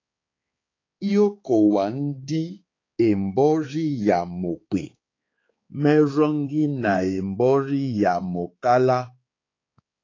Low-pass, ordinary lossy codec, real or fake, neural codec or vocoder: 7.2 kHz; AAC, 32 kbps; fake; codec, 16 kHz, 4 kbps, X-Codec, HuBERT features, trained on balanced general audio